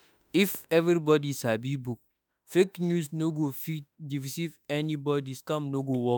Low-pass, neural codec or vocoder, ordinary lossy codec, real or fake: none; autoencoder, 48 kHz, 32 numbers a frame, DAC-VAE, trained on Japanese speech; none; fake